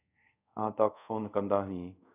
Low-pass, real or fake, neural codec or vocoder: 3.6 kHz; fake; codec, 24 kHz, 0.5 kbps, DualCodec